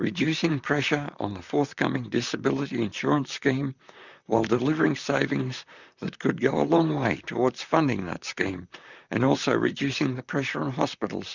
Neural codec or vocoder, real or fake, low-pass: vocoder, 44.1 kHz, 128 mel bands, Pupu-Vocoder; fake; 7.2 kHz